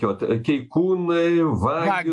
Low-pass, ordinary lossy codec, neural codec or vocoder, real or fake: 10.8 kHz; AAC, 64 kbps; none; real